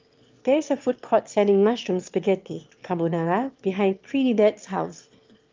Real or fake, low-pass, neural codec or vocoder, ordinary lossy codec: fake; 7.2 kHz; autoencoder, 22.05 kHz, a latent of 192 numbers a frame, VITS, trained on one speaker; Opus, 32 kbps